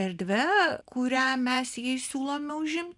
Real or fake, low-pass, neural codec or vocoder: fake; 10.8 kHz; vocoder, 44.1 kHz, 128 mel bands every 512 samples, BigVGAN v2